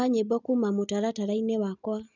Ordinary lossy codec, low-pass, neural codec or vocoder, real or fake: none; 7.2 kHz; none; real